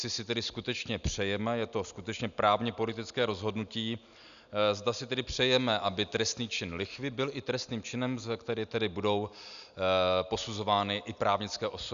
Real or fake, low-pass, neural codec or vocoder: real; 7.2 kHz; none